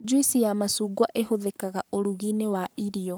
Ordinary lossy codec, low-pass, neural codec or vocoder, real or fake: none; none; codec, 44.1 kHz, 7.8 kbps, Pupu-Codec; fake